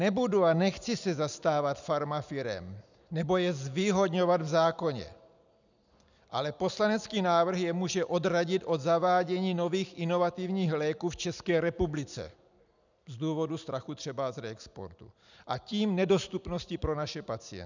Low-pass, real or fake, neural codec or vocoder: 7.2 kHz; real; none